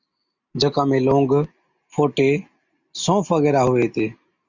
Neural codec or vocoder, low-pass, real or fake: none; 7.2 kHz; real